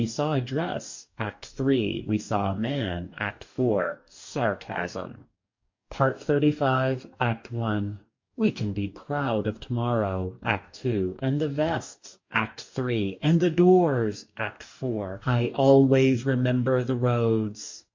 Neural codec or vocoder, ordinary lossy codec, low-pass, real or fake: codec, 44.1 kHz, 2.6 kbps, DAC; MP3, 48 kbps; 7.2 kHz; fake